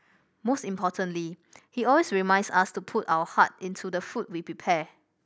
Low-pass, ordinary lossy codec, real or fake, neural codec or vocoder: none; none; real; none